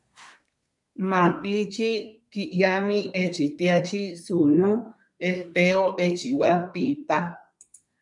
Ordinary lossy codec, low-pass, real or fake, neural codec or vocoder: MP3, 96 kbps; 10.8 kHz; fake; codec, 24 kHz, 1 kbps, SNAC